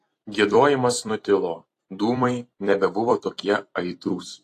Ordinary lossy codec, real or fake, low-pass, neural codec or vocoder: AAC, 48 kbps; fake; 14.4 kHz; vocoder, 44.1 kHz, 128 mel bands every 256 samples, BigVGAN v2